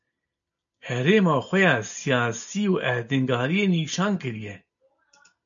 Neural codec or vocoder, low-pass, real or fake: none; 7.2 kHz; real